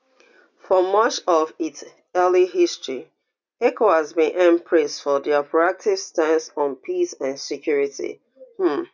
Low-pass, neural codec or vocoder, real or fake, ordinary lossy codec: 7.2 kHz; none; real; none